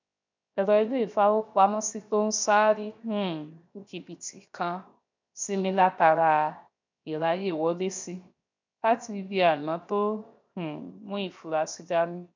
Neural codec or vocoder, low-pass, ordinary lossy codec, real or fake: codec, 16 kHz, 0.7 kbps, FocalCodec; 7.2 kHz; MP3, 64 kbps; fake